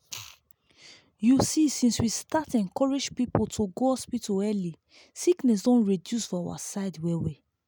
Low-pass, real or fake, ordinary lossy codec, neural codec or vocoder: none; real; none; none